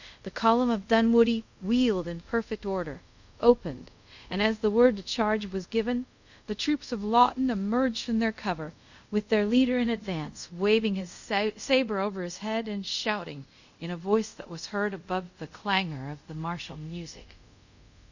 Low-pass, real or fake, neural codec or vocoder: 7.2 kHz; fake; codec, 24 kHz, 0.5 kbps, DualCodec